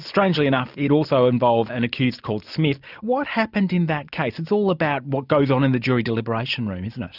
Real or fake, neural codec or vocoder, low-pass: real; none; 5.4 kHz